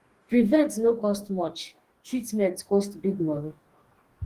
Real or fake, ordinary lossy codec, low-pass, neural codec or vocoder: fake; Opus, 24 kbps; 14.4 kHz; codec, 44.1 kHz, 2.6 kbps, DAC